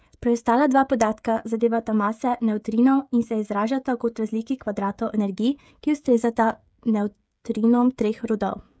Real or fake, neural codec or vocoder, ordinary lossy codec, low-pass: fake; codec, 16 kHz, 16 kbps, FreqCodec, smaller model; none; none